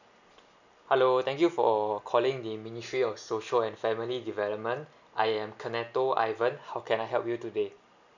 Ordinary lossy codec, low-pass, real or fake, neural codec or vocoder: none; 7.2 kHz; real; none